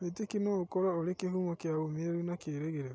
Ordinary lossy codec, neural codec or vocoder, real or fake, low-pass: none; none; real; none